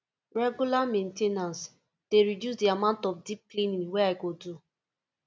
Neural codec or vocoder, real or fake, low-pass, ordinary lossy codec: none; real; none; none